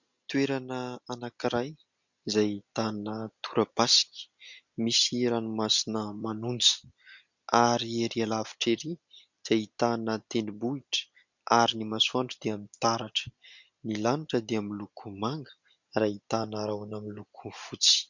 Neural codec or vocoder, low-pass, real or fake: none; 7.2 kHz; real